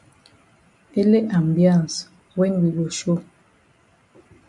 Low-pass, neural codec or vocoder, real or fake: 10.8 kHz; none; real